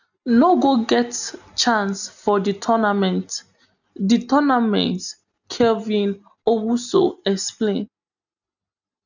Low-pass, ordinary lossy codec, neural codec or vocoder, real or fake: 7.2 kHz; none; none; real